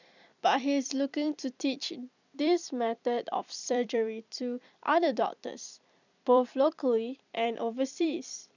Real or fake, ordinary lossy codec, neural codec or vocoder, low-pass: fake; none; vocoder, 44.1 kHz, 128 mel bands every 256 samples, BigVGAN v2; 7.2 kHz